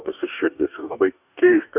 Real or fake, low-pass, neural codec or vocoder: fake; 3.6 kHz; codec, 44.1 kHz, 2.6 kbps, DAC